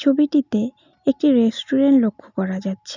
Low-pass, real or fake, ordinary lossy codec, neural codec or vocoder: 7.2 kHz; real; none; none